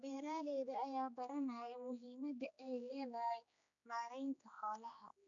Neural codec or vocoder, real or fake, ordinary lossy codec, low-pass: codec, 16 kHz, 2 kbps, X-Codec, HuBERT features, trained on general audio; fake; none; 7.2 kHz